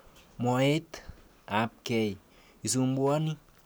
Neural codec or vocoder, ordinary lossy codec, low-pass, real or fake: none; none; none; real